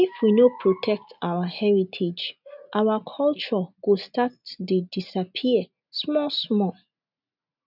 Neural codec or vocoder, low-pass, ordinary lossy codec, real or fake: none; 5.4 kHz; none; real